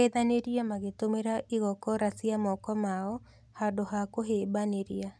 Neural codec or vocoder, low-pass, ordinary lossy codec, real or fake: none; none; none; real